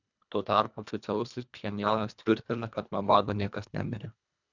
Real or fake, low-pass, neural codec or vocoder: fake; 7.2 kHz; codec, 24 kHz, 1.5 kbps, HILCodec